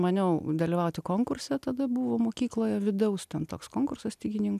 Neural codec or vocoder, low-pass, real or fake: none; 14.4 kHz; real